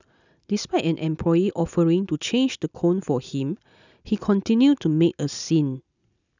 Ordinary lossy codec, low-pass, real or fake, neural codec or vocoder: none; 7.2 kHz; real; none